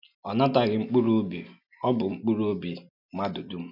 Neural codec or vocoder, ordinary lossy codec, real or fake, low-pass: none; none; real; 5.4 kHz